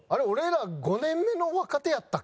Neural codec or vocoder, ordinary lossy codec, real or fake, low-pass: none; none; real; none